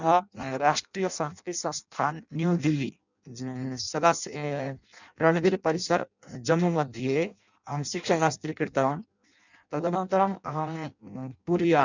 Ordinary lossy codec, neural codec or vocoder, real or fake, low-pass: none; codec, 16 kHz in and 24 kHz out, 0.6 kbps, FireRedTTS-2 codec; fake; 7.2 kHz